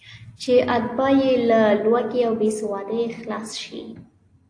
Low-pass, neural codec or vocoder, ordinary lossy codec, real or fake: 9.9 kHz; none; AAC, 48 kbps; real